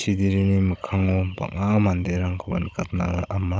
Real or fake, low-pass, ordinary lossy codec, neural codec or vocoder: fake; none; none; codec, 16 kHz, 16 kbps, FunCodec, trained on Chinese and English, 50 frames a second